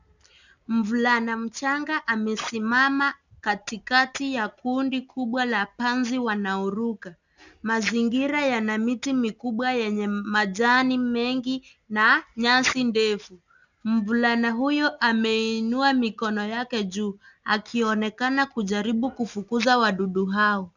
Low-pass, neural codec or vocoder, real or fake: 7.2 kHz; none; real